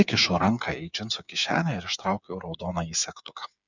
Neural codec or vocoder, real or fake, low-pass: codec, 16 kHz, 8 kbps, FreqCodec, smaller model; fake; 7.2 kHz